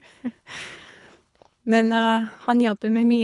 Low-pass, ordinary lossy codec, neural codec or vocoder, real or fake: 10.8 kHz; none; codec, 24 kHz, 3 kbps, HILCodec; fake